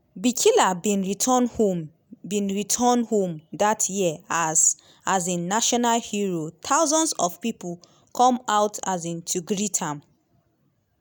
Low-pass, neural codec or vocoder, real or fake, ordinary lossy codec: none; none; real; none